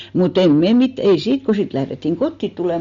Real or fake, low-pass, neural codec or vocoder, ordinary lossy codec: real; 7.2 kHz; none; MP3, 48 kbps